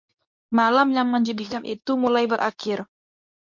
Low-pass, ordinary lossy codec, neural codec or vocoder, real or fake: 7.2 kHz; MP3, 48 kbps; codec, 24 kHz, 0.9 kbps, WavTokenizer, medium speech release version 2; fake